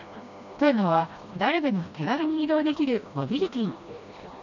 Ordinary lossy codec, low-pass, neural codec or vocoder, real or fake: none; 7.2 kHz; codec, 16 kHz, 1 kbps, FreqCodec, smaller model; fake